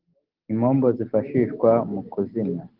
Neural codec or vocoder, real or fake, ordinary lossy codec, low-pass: none; real; Opus, 16 kbps; 5.4 kHz